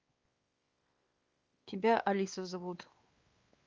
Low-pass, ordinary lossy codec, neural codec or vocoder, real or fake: 7.2 kHz; Opus, 24 kbps; codec, 16 kHz, 2 kbps, X-Codec, WavLM features, trained on Multilingual LibriSpeech; fake